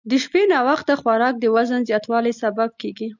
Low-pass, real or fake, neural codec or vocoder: 7.2 kHz; real; none